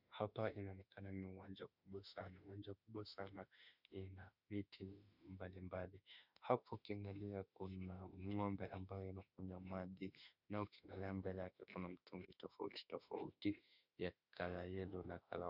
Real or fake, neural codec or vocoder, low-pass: fake; autoencoder, 48 kHz, 32 numbers a frame, DAC-VAE, trained on Japanese speech; 5.4 kHz